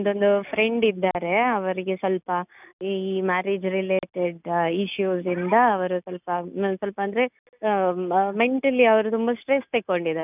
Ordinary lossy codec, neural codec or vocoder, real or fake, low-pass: none; none; real; 3.6 kHz